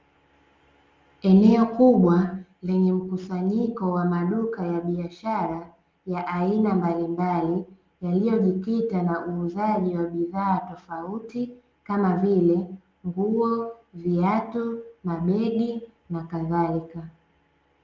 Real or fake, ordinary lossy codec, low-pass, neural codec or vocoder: real; Opus, 32 kbps; 7.2 kHz; none